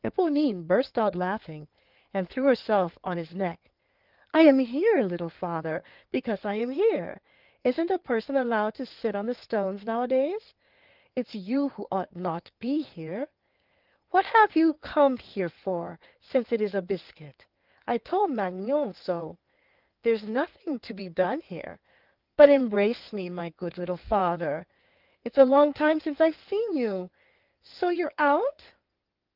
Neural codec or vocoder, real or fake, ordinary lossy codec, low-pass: codec, 16 kHz in and 24 kHz out, 2.2 kbps, FireRedTTS-2 codec; fake; Opus, 24 kbps; 5.4 kHz